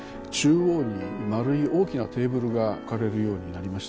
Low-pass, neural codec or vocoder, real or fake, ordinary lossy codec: none; none; real; none